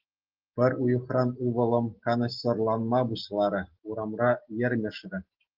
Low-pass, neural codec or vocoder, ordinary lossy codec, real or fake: 5.4 kHz; none; Opus, 16 kbps; real